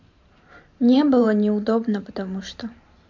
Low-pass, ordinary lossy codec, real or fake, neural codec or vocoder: 7.2 kHz; MP3, 48 kbps; fake; vocoder, 44.1 kHz, 128 mel bands every 512 samples, BigVGAN v2